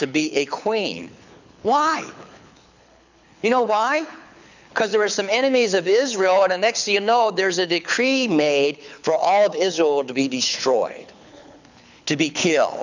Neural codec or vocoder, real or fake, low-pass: codec, 24 kHz, 6 kbps, HILCodec; fake; 7.2 kHz